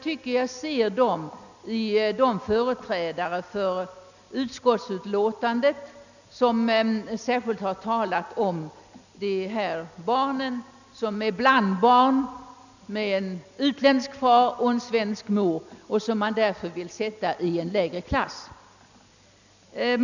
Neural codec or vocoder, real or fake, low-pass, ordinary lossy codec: none; real; 7.2 kHz; none